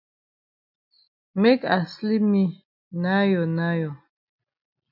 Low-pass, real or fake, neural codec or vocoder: 5.4 kHz; real; none